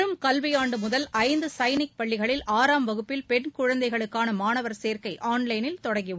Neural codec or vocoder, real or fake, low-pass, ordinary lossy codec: none; real; none; none